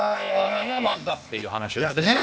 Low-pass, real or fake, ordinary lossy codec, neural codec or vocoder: none; fake; none; codec, 16 kHz, 0.8 kbps, ZipCodec